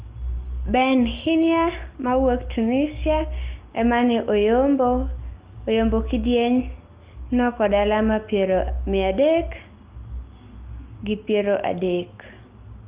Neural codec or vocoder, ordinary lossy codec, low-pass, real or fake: none; Opus, 24 kbps; 3.6 kHz; real